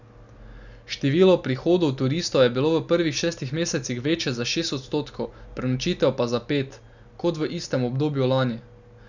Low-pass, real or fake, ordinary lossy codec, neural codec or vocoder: 7.2 kHz; real; none; none